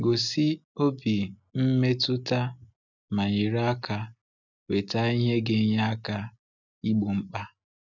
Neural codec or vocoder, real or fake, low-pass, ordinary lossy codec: none; real; 7.2 kHz; none